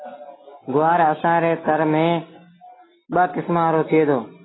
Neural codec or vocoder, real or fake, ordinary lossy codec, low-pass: none; real; AAC, 16 kbps; 7.2 kHz